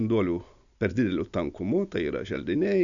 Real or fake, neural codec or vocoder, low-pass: real; none; 7.2 kHz